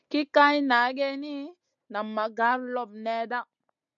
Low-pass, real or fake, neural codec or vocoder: 7.2 kHz; real; none